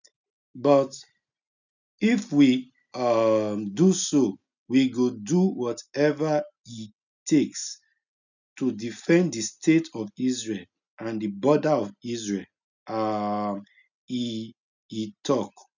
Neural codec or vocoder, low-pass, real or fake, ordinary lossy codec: none; 7.2 kHz; real; none